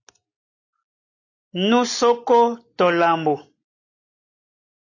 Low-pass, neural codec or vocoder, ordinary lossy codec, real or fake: 7.2 kHz; none; AAC, 32 kbps; real